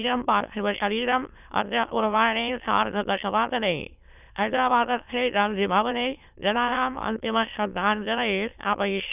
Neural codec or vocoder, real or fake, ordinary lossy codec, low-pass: autoencoder, 22.05 kHz, a latent of 192 numbers a frame, VITS, trained on many speakers; fake; none; 3.6 kHz